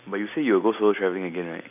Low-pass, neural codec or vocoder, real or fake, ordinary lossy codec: 3.6 kHz; none; real; none